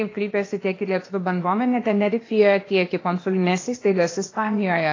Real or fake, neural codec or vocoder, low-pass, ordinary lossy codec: fake; codec, 16 kHz, 0.8 kbps, ZipCodec; 7.2 kHz; AAC, 32 kbps